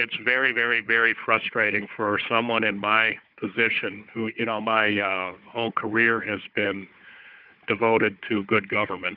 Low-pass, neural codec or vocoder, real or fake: 5.4 kHz; codec, 16 kHz, 4 kbps, FunCodec, trained on Chinese and English, 50 frames a second; fake